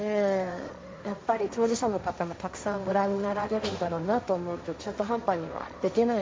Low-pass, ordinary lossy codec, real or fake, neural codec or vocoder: none; none; fake; codec, 16 kHz, 1.1 kbps, Voila-Tokenizer